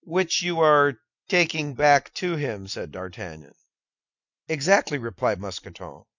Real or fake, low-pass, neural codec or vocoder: real; 7.2 kHz; none